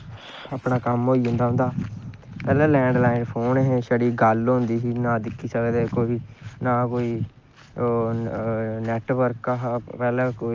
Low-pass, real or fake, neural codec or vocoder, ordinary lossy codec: 7.2 kHz; real; none; Opus, 24 kbps